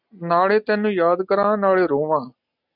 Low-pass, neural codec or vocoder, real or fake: 5.4 kHz; none; real